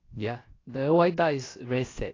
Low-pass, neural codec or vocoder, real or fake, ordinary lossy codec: 7.2 kHz; codec, 16 kHz, about 1 kbps, DyCAST, with the encoder's durations; fake; AAC, 32 kbps